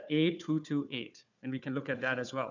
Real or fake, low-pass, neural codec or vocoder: fake; 7.2 kHz; codec, 16 kHz, 4 kbps, FunCodec, trained on Chinese and English, 50 frames a second